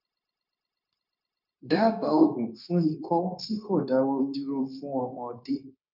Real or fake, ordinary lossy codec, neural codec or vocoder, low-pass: fake; none; codec, 16 kHz, 0.9 kbps, LongCat-Audio-Codec; 5.4 kHz